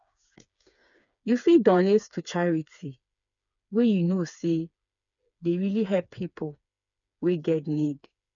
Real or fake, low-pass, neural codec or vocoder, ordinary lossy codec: fake; 7.2 kHz; codec, 16 kHz, 4 kbps, FreqCodec, smaller model; none